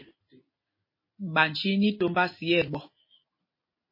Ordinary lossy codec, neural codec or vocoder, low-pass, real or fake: MP3, 24 kbps; none; 5.4 kHz; real